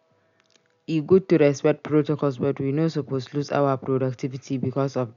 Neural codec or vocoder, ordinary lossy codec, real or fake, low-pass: none; none; real; 7.2 kHz